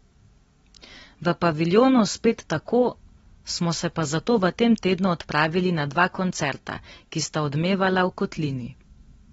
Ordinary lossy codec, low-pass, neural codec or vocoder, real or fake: AAC, 24 kbps; 19.8 kHz; none; real